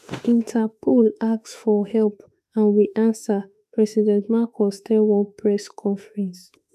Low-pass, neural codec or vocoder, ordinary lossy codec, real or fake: 14.4 kHz; autoencoder, 48 kHz, 32 numbers a frame, DAC-VAE, trained on Japanese speech; none; fake